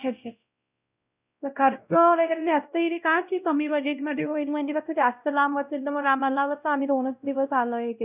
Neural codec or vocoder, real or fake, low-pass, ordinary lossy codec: codec, 16 kHz, 0.5 kbps, X-Codec, WavLM features, trained on Multilingual LibriSpeech; fake; 3.6 kHz; none